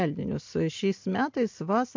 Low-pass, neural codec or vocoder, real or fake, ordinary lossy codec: 7.2 kHz; none; real; MP3, 48 kbps